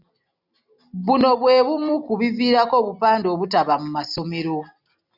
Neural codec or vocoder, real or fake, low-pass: none; real; 5.4 kHz